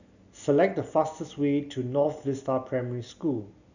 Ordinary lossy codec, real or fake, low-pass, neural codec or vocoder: none; real; 7.2 kHz; none